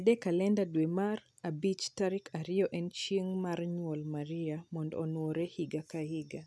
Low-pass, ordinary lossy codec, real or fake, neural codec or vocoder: none; none; real; none